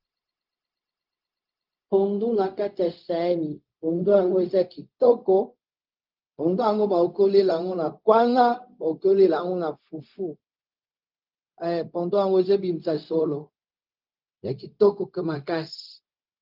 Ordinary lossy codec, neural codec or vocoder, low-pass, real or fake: Opus, 32 kbps; codec, 16 kHz, 0.4 kbps, LongCat-Audio-Codec; 5.4 kHz; fake